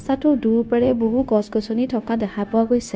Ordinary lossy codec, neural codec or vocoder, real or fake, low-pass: none; codec, 16 kHz, 0.9 kbps, LongCat-Audio-Codec; fake; none